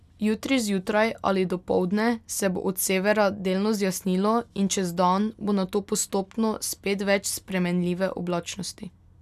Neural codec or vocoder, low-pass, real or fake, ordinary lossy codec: none; 14.4 kHz; real; none